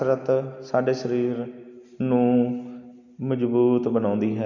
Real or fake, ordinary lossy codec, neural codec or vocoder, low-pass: real; none; none; 7.2 kHz